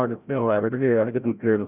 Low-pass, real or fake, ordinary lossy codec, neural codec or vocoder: 3.6 kHz; fake; none; codec, 16 kHz, 0.5 kbps, FreqCodec, larger model